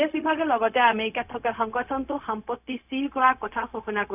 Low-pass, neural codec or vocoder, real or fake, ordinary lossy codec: 3.6 kHz; codec, 16 kHz, 0.4 kbps, LongCat-Audio-Codec; fake; none